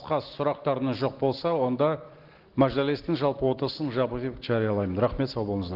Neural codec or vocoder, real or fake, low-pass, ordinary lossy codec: none; real; 5.4 kHz; Opus, 16 kbps